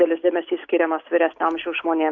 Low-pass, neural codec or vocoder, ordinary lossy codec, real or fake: 7.2 kHz; none; AAC, 48 kbps; real